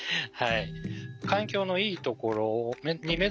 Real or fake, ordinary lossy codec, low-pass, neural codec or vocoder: real; none; none; none